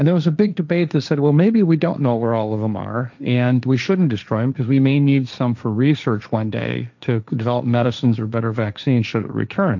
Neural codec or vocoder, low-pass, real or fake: codec, 16 kHz, 1.1 kbps, Voila-Tokenizer; 7.2 kHz; fake